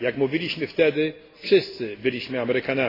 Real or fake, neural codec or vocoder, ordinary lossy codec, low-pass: real; none; AAC, 24 kbps; 5.4 kHz